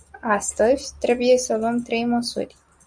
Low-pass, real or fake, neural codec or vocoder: 9.9 kHz; real; none